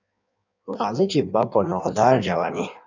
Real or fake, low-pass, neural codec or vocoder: fake; 7.2 kHz; codec, 16 kHz in and 24 kHz out, 1.1 kbps, FireRedTTS-2 codec